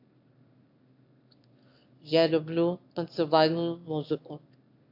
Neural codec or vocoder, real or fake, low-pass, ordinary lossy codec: autoencoder, 22.05 kHz, a latent of 192 numbers a frame, VITS, trained on one speaker; fake; 5.4 kHz; AAC, 48 kbps